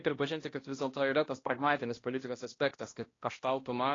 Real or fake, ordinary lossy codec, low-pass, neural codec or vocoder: fake; AAC, 32 kbps; 7.2 kHz; codec, 16 kHz, 1 kbps, X-Codec, HuBERT features, trained on balanced general audio